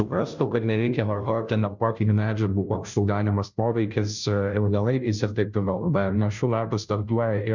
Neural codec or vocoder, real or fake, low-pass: codec, 16 kHz, 0.5 kbps, FunCodec, trained on Chinese and English, 25 frames a second; fake; 7.2 kHz